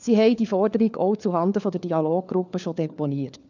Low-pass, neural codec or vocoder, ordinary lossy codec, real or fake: 7.2 kHz; codec, 16 kHz, 4 kbps, FunCodec, trained on LibriTTS, 50 frames a second; none; fake